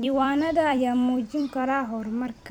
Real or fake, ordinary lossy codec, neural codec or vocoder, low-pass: fake; none; vocoder, 44.1 kHz, 128 mel bands every 256 samples, BigVGAN v2; 19.8 kHz